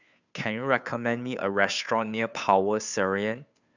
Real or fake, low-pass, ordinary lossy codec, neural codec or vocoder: fake; 7.2 kHz; none; codec, 16 kHz, 2 kbps, FunCodec, trained on Chinese and English, 25 frames a second